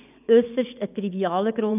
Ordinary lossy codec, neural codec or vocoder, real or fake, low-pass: none; vocoder, 24 kHz, 100 mel bands, Vocos; fake; 3.6 kHz